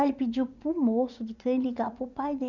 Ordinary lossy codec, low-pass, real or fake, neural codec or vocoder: none; 7.2 kHz; fake; vocoder, 44.1 kHz, 80 mel bands, Vocos